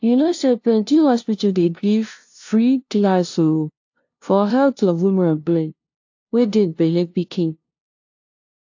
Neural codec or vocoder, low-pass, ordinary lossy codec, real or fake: codec, 16 kHz, 0.5 kbps, FunCodec, trained on LibriTTS, 25 frames a second; 7.2 kHz; AAC, 48 kbps; fake